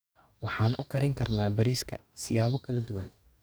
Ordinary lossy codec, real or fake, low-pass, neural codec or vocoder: none; fake; none; codec, 44.1 kHz, 2.6 kbps, DAC